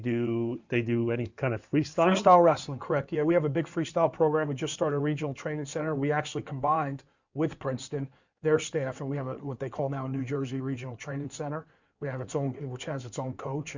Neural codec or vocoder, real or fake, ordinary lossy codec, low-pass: codec, 16 kHz in and 24 kHz out, 2.2 kbps, FireRedTTS-2 codec; fake; Opus, 64 kbps; 7.2 kHz